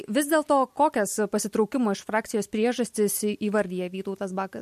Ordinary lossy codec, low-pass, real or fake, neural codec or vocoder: MP3, 64 kbps; 14.4 kHz; real; none